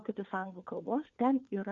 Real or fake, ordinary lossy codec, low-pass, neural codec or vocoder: fake; Opus, 64 kbps; 7.2 kHz; codec, 16 kHz, 4 kbps, FunCodec, trained on LibriTTS, 50 frames a second